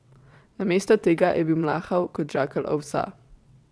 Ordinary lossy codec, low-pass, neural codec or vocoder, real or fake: none; none; vocoder, 22.05 kHz, 80 mel bands, WaveNeXt; fake